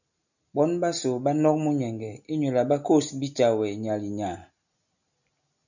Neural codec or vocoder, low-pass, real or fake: none; 7.2 kHz; real